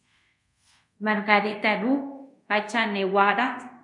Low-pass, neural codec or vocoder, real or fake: 10.8 kHz; codec, 24 kHz, 0.5 kbps, DualCodec; fake